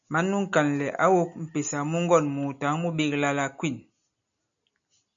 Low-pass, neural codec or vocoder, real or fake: 7.2 kHz; none; real